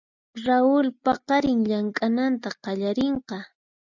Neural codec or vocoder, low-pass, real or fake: none; 7.2 kHz; real